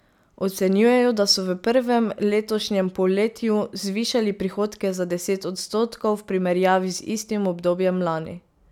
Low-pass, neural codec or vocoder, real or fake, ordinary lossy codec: 19.8 kHz; none; real; none